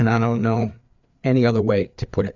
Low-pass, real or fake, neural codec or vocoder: 7.2 kHz; fake; codec, 16 kHz, 4 kbps, FreqCodec, larger model